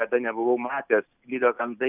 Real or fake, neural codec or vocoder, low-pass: real; none; 3.6 kHz